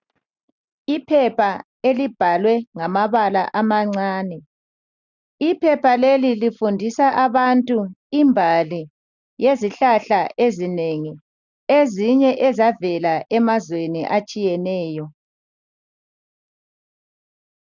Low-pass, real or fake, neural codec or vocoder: 7.2 kHz; real; none